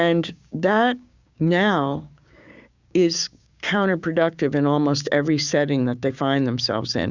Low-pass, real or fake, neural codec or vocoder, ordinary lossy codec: 7.2 kHz; fake; codec, 16 kHz, 6 kbps, DAC; Opus, 64 kbps